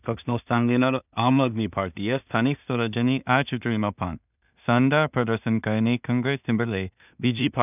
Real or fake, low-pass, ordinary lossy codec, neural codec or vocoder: fake; 3.6 kHz; none; codec, 16 kHz in and 24 kHz out, 0.4 kbps, LongCat-Audio-Codec, two codebook decoder